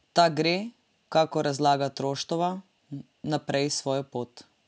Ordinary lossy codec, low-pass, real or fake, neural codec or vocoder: none; none; real; none